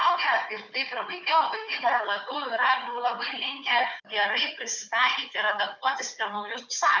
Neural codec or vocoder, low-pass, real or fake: codec, 16 kHz, 4 kbps, FunCodec, trained on Chinese and English, 50 frames a second; 7.2 kHz; fake